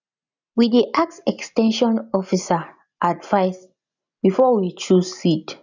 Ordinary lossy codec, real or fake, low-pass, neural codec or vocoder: none; real; 7.2 kHz; none